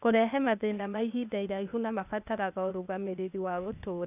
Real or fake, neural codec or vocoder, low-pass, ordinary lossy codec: fake; codec, 16 kHz, 0.8 kbps, ZipCodec; 3.6 kHz; none